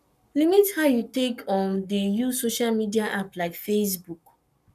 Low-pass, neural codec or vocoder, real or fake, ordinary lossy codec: 14.4 kHz; codec, 44.1 kHz, 7.8 kbps, Pupu-Codec; fake; none